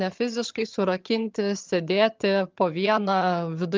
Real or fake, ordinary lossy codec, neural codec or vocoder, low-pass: fake; Opus, 32 kbps; vocoder, 22.05 kHz, 80 mel bands, HiFi-GAN; 7.2 kHz